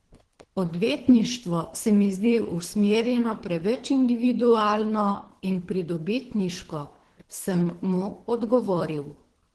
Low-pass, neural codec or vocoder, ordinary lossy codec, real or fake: 10.8 kHz; codec, 24 kHz, 3 kbps, HILCodec; Opus, 16 kbps; fake